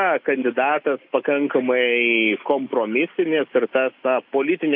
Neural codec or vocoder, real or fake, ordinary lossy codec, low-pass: none; real; AAC, 48 kbps; 5.4 kHz